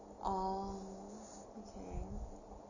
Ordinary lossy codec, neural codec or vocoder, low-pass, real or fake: none; none; 7.2 kHz; real